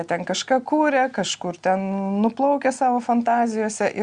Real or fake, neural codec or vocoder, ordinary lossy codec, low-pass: real; none; Opus, 64 kbps; 9.9 kHz